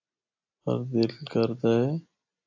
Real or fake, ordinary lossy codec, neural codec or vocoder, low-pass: real; AAC, 48 kbps; none; 7.2 kHz